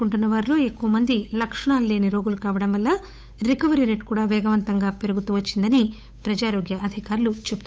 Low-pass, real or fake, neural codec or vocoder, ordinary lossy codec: none; fake; codec, 16 kHz, 8 kbps, FunCodec, trained on Chinese and English, 25 frames a second; none